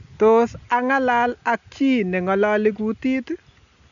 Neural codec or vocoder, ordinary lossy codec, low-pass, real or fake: none; none; 7.2 kHz; real